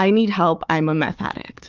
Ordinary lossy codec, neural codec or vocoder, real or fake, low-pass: Opus, 24 kbps; codec, 16 kHz, 4 kbps, FunCodec, trained on Chinese and English, 50 frames a second; fake; 7.2 kHz